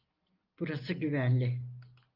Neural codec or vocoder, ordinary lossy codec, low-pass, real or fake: none; Opus, 24 kbps; 5.4 kHz; real